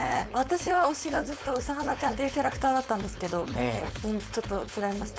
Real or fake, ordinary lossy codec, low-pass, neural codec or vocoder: fake; none; none; codec, 16 kHz, 4.8 kbps, FACodec